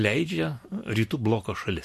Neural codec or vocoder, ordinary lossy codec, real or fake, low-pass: none; MP3, 64 kbps; real; 14.4 kHz